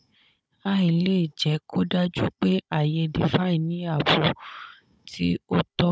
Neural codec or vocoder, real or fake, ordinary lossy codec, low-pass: codec, 16 kHz, 16 kbps, FunCodec, trained on Chinese and English, 50 frames a second; fake; none; none